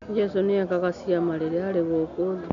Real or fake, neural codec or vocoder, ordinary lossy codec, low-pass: real; none; none; 7.2 kHz